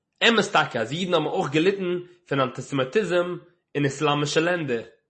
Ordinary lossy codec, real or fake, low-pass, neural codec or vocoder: MP3, 32 kbps; real; 10.8 kHz; none